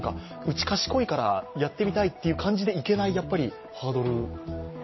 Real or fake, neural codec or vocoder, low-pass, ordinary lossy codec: real; none; 7.2 kHz; MP3, 24 kbps